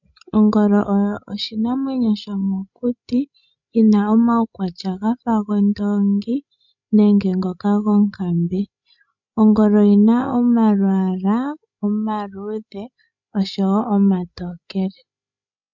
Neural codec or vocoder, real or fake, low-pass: codec, 16 kHz, 16 kbps, FreqCodec, larger model; fake; 7.2 kHz